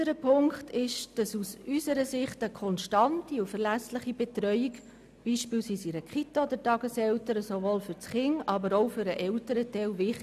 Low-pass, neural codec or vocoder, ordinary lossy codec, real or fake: 14.4 kHz; none; none; real